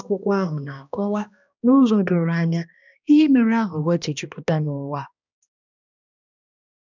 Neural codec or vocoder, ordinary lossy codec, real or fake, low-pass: codec, 16 kHz, 1 kbps, X-Codec, HuBERT features, trained on balanced general audio; none; fake; 7.2 kHz